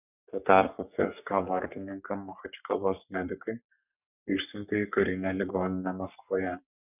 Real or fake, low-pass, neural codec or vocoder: fake; 3.6 kHz; codec, 44.1 kHz, 3.4 kbps, Pupu-Codec